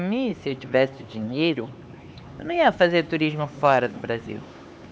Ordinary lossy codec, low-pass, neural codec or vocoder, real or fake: none; none; codec, 16 kHz, 4 kbps, X-Codec, HuBERT features, trained on LibriSpeech; fake